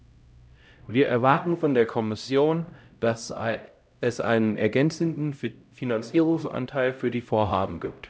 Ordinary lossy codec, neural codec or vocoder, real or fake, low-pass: none; codec, 16 kHz, 0.5 kbps, X-Codec, HuBERT features, trained on LibriSpeech; fake; none